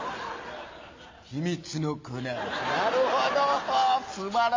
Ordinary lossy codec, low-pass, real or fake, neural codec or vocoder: MP3, 48 kbps; 7.2 kHz; real; none